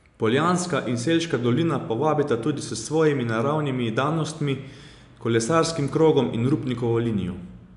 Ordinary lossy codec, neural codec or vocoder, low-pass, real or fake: none; none; 10.8 kHz; real